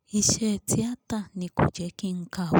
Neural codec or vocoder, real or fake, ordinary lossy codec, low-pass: none; real; none; none